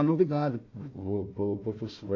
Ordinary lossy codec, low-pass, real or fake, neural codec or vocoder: AAC, 48 kbps; 7.2 kHz; fake; codec, 16 kHz, 1 kbps, FunCodec, trained on Chinese and English, 50 frames a second